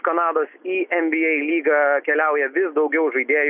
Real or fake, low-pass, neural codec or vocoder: real; 3.6 kHz; none